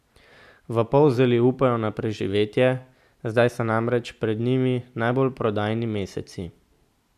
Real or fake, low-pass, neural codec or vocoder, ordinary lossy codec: real; 14.4 kHz; none; none